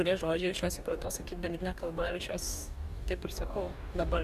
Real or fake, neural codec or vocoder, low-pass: fake; codec, 44.1 kHz, 2.6 kbps, DAC; 14.4 kHz